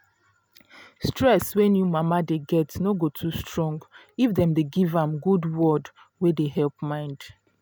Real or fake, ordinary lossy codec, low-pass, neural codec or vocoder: real; none; none; none